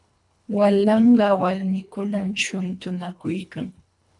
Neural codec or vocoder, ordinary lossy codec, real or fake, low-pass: codec, 24 kHz, 1.5 kbps, HILCodec; MP3, 64 kbps; fake; 10.8 kHz